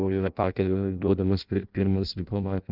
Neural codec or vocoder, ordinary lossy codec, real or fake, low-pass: codec, 16 kHz in and 24 kHz out, 0.6 kbps, FireRedTTS-2 codec; Opus, 24 kbps; fake; 5.4 kHz